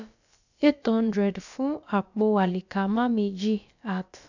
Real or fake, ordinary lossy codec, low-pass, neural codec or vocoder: fake; none; 7.2 kHz; codec, 16 kHz, about 1 kbps, DyCAST, with the encoder's durations